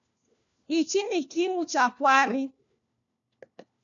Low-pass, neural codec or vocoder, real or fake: 7.2 kHz; codec, 16 kHz, 1 kbps, FunCodec, trained on LibriTTS, 50 frames a second; fake